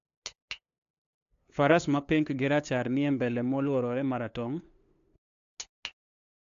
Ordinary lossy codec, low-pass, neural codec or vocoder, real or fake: AAC, 64 kbps; 7.2 kHz; codec, 16 kHz, 2 kbps, FunCodec, trained on LibriTTS, 25 frames a second; fake